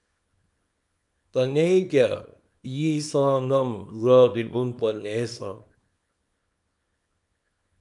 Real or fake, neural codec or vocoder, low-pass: fake; codec, 24 kHz, 0.9 kbps, WavTokenizer, small release; 10.8 kHz